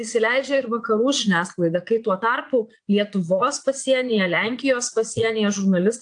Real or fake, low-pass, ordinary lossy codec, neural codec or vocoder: fake; 9.9 kHz; AAC, 64 kbps; vocoder, 22.05 kHz, 80 mel bands, Vocos